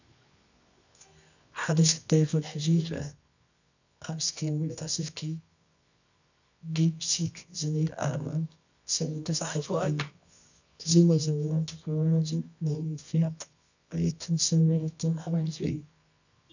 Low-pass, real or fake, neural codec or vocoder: 7.2 kHz; fake; codec, 24 kHz, 0.9 kbps, WavTokenizer, medium music audio release